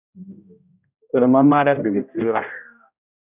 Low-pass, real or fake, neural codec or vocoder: 3.6 kHz; fake; codec, 16 kHz, 0.5 kbps, X-Codec, HuBERT features, trained on general audio